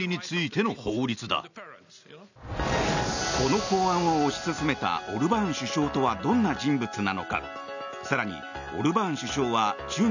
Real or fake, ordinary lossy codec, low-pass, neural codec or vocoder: real; none; 7.2 kHz; none